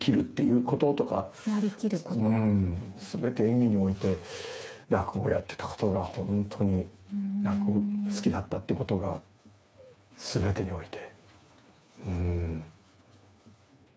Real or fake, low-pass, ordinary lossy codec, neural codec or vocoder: fake; none; none; codec, 16 kHz, 4 kbps, FreqCodec, smaller model